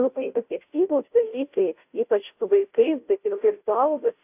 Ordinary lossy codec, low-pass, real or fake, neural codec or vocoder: AAC, 32 kbps; 3.6 kHz; fake; codec, 16 kHz, 0.5 kbps, FunCodec, trained on Chinese and English, 25 frames a second